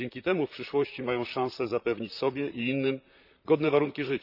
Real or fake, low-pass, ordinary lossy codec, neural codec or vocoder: fake; 5.4 kHz; none; vocoder, 44.1 kHz, 128 mel bands, Pupu-Vocoder